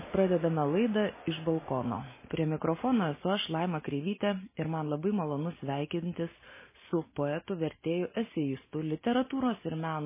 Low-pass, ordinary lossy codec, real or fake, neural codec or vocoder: 3.6 kHz; MP3, 16 kbps; real; none